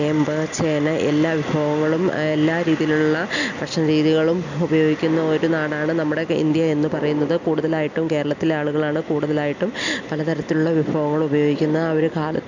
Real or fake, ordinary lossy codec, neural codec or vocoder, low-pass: real; none; none; 7.2 kHz